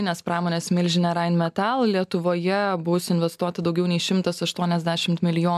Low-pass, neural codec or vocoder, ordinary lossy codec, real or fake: 14.4 kHz; none; MP3, 96 kbps; real